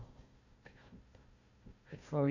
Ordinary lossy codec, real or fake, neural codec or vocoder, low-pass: none; fake; codec, 16 kHz, 1 kbps, FunCodec, trained on Chinese and English, 50 frames a second; 7.2 kHz